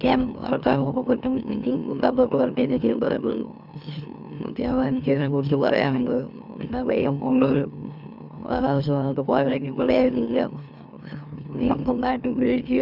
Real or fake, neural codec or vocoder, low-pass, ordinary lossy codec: fake; autoencoder, 44.1 kHz, a latent of 192 numbers a frame, MeloTTS; 5.4 kHz; none